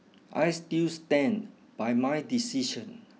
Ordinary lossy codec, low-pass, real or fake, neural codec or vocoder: none; none; real; none